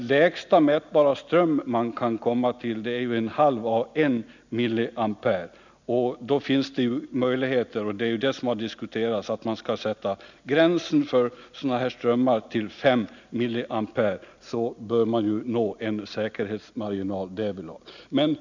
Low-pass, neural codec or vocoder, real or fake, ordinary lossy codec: 7.2 kHz; none; real; none